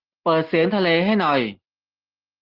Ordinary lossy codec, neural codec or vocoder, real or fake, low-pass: Opus, 16 kbps; none; real; 5.4 kHz